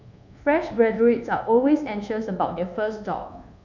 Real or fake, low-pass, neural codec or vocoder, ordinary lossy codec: fake; 7.2 kHz; codec, 24 kHz, 1.2 kbps, DualCodec; none